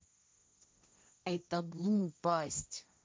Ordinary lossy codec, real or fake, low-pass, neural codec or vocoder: none; fake; none; codec, 16 kHz, 1.1 kbps, Voila-Tokenizer